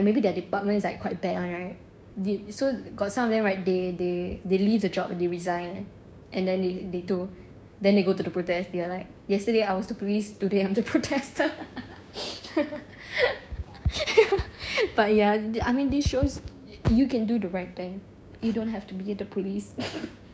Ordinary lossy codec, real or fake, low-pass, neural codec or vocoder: none; fake; none; codec, 16 kHz, 6 kbps, DAC